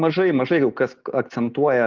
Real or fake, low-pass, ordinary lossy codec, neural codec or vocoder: real; 7.2 kHz; Opus, 32 kbps; none